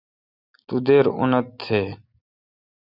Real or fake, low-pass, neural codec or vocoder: real; 5.4 kHz; none